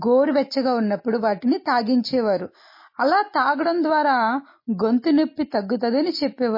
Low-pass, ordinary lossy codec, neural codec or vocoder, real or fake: 5.4 kHz; MP3, 24 kbps; none; real